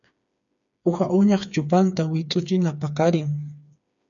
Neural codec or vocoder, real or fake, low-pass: codec, 16 kHz, 4 kbps, FreqCodec, smaller model; fake; 7.2 kHz